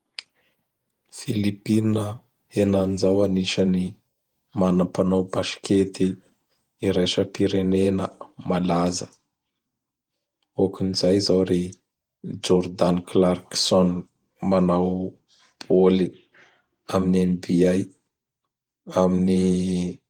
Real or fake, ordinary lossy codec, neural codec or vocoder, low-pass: fake; Opus, 24 kbps; vocoder, 48 kHz, 128 mel bands, Vocos; 19.8 kHz